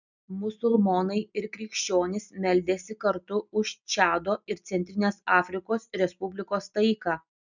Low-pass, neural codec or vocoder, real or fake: 7.2 kHz; none; real